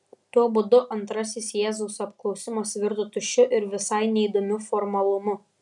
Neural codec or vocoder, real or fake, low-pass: none; real; 10.8 kHz